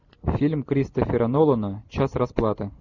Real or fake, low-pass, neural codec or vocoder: real; 7.2 kHz; none